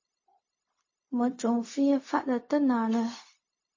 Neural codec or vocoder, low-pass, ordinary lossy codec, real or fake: codec, 16 kHz, 0.4 kbps, LongCat-Audio-Codec; 7.2 kHz; MP3, 32 kbps; fake